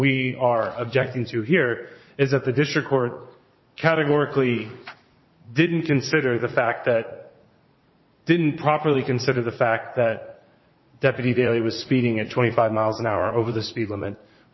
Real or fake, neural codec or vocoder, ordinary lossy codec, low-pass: fake; vocoder, 44.1 kHz, 80 mel bands, Vocos; MP3, 24 kbps; 7.2 kHz